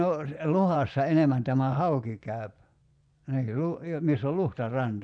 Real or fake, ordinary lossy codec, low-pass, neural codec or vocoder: fake; none; 9.9 kHz; vocoder, 24 kHz, 100 mel bands, Vocos